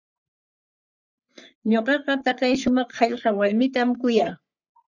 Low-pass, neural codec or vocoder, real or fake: 7.2 kHz; codec, 44.1 kHz, 3.4 kbps, Pupu-Codec; fake